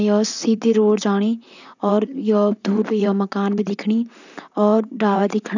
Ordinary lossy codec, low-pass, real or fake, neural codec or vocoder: none; 7.2 kHz; fake; vocoder, 44.1 kHz, 128 mel bands, Pupu-Vocoder